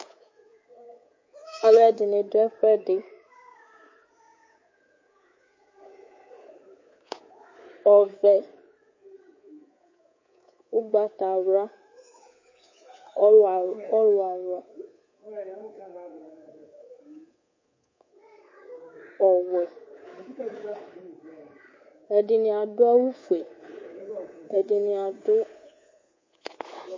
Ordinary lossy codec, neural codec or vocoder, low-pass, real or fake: MP3, 32 kbps; codec, 24 kHz, 3.1 kbps, DualCodec; 7.2 kHz; fake